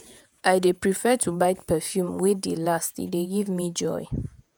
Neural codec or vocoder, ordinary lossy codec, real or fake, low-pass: vocoder, 48 kHz, 128 mel bands, Vocos; none; fake; none